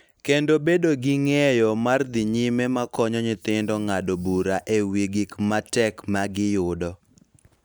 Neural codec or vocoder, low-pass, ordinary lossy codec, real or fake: none; none; none; real